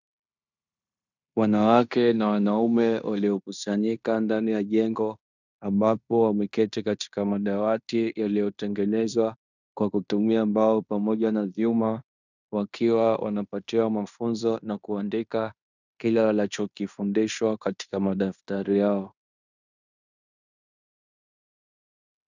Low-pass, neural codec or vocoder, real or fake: 7.2 kHz; codec, 16 kHz in and 24 kHz out, 0.9 kbps, LongCat-Audio-Codec, fine tuned four codebook decoder; fake